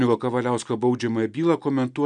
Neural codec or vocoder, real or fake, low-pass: none; real; 9.9 kHz